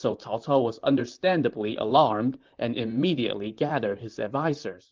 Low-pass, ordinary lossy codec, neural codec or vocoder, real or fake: 7.2 kHz; Opus, 24 kbps; vocoder, 44.1 kHz, 128 mel bands, Pupu-Vocoder; fake